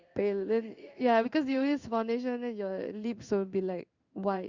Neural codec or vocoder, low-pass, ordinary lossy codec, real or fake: codec, 16 kHz in and 24 kHz out, 1 kbps, XY-Tokenizer; 7.2 kHz; Opus, 64 kbps; fake